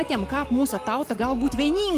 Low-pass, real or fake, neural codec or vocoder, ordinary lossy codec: 14.4 kHz; fake; codec, 44.1 kHz, 7.8 kbps, Pupu-Codec; Opus, 32 kbps